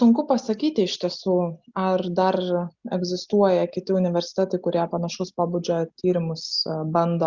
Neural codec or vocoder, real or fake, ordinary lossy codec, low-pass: none; real; Opus, 64 kbps; 7.2 kHz